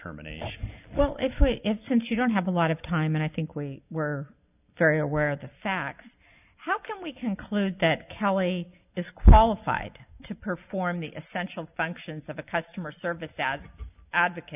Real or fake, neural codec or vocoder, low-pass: real; none; 3.6 kHz